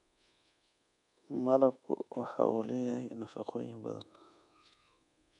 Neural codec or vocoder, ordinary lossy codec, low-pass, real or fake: codec, 24 kHz, 1.2 kbps, DualCodec; none; 10.8 kHz; fake